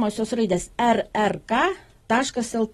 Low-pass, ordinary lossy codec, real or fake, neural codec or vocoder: 19.8 kHz; AAC, 32 kbps; real; none